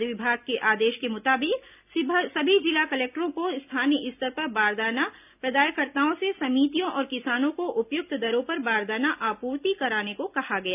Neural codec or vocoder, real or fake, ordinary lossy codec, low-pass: none; real; none; 3.6 kHz